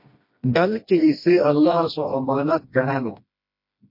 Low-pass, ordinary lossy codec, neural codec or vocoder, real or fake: 5.4 kHz; MP3, 32 kbps; codec, 16 kHz, 1 kbps, FreqCodec, smaller model; fake